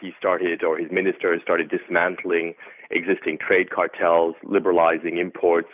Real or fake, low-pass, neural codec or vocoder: real; 3.6 kHz; none